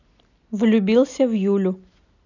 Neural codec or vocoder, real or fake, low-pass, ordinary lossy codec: none; real; 7.2 kHz; none